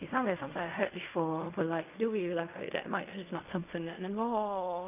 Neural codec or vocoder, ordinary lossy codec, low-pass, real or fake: codec, 16 kHz in and 24 kHz out, 0.4 kbps, LongCat-Audio-Codec, fine tuned four codebook decoder; none; 3.6 kHz; fake